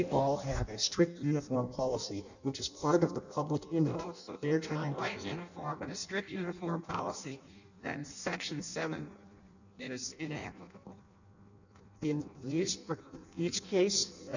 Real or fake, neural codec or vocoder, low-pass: fake; codec, 16 kHz in and 24 kHz out, 0.6 kbps, FireRedTTS-2 codec; 7.2 kHz